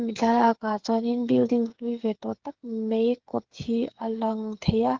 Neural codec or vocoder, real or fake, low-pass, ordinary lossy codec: codec, 16 kHz, 8 kbps, FreqCodec, smaller model; fake; 7.2 kHz; Opus, 16 kbps